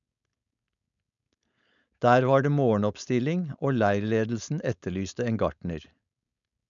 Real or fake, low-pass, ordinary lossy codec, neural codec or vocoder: fake; 7.2 kHz; none; codec, 16 kHz, 4.8 kbps, FACodec